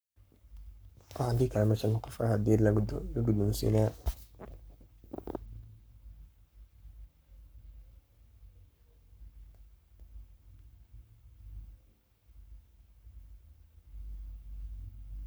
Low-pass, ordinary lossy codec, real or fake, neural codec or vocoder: none; none; fake; codec, 44.1 kHz, 7.8 kbps, Pupu-Codec